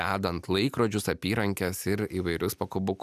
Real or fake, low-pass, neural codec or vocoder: real; 14.4 kHz; none